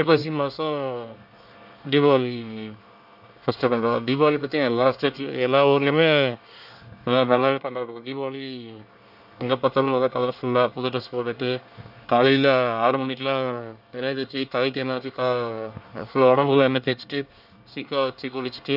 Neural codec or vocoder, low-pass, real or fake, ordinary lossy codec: codec, 24 kHz, 1 kbps, SNAC; 5.4 kHz; fake; none